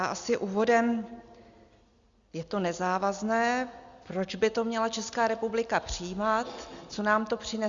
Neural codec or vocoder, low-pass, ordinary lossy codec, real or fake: none; 7.2 kHz; Opus, 64 kbps; real